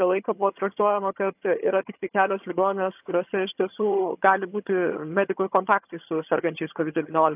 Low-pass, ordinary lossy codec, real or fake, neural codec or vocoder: 3.6 kHz; AAC, 32 kbps; fake; vocoder, 22.05 kHz, 80 mel bands, HiFi-GAN